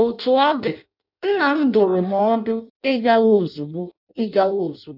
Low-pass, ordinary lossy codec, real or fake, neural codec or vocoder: 5.4 kHz; none; fake; codec, 16 kHz in and 24 kHz out, 0.6 kbps, FireRedTTS-2 codec